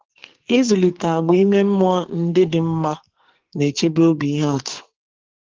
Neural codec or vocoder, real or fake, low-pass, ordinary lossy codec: codec, 44.1 kHz, 2.6 kbps, SNAC; fake; 7.2 kHz; Opus, 16 kbps